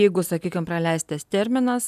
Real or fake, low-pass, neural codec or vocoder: real; 14.4 kHz; none